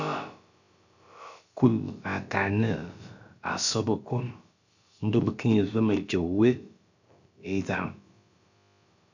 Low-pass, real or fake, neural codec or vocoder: 7.2 kHz; fake; codec, 16 kHz, about 1 kbps, DyCAST, with the encoder's durations